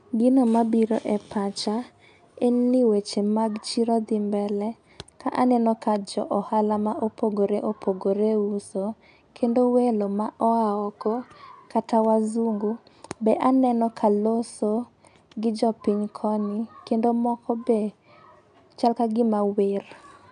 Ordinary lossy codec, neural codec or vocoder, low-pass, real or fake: none; none; 9.9 kHz; real